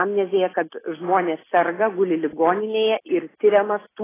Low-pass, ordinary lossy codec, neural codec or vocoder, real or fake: 3.6 kHz; AAC, 16 kbps; none; real